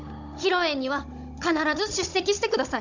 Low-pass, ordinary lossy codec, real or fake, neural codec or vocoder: 7.2 kHz; none; fake; codec, 16 kHz, 16 kbps, FunCodec, trained on Chinese and English, 50 frames a second